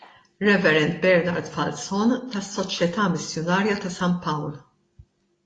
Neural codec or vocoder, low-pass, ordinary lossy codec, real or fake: none; 9.9 kHz; AAC, 32 kbps; real